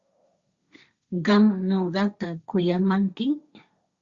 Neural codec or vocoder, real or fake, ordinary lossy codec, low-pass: codec, 16 kHz, 1.1 kbps, Voila-Tokenizer; fake; Opus, 64 kbps; 7.2 kHz